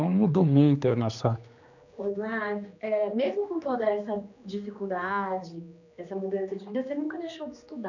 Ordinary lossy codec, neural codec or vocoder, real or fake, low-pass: none; codec, 16 kHz, 2 kbps, X-Codec, HuBERT features, trained on general audio; fake; 7.2 kHz